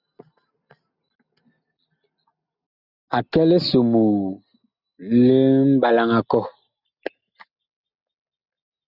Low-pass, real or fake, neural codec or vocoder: 5.4 kHz; real; none